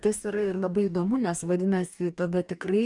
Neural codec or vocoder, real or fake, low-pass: codec, 44.1 kHz, 2.6 kbps, DAC; fake; 10.8 kHz